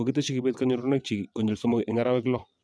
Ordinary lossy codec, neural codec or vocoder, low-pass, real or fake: none; vocoder, 22.05 kHz, 80 mel bands, WaveNeXt; none; fake